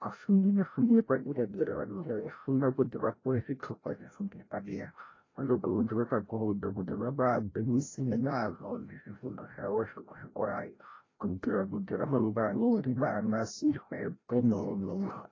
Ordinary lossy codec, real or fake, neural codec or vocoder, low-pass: AAC, 32 kbps; fake; codec, 16 kHz, 0.5 kbps, FreqCodec, larger model; 7.2 kHz